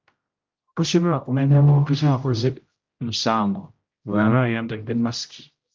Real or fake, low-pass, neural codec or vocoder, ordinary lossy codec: fake; 7.2 kHz; codec, 16 kHz, 0.5 kbps, X-Codec, HuBERT features, trained on general audio; Opus, 24 kbps